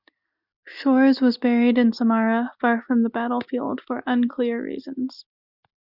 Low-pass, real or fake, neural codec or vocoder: 5.4 kHz; real; none